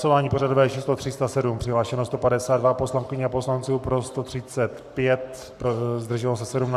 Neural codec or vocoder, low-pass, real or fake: codec, 44.1 kHz, 7.8 kbps, Pupu-Codec; 14.4 kHz; fake